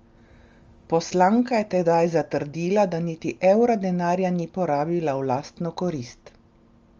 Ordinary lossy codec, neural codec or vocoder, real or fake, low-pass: Opus, 32 kbps; none; real; 7.2 kHz